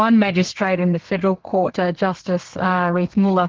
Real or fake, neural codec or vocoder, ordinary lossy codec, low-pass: fake; codec, 32 kHz, 1.9 kbps, SNAC; Opus, 16 kbps; 7.2 kHz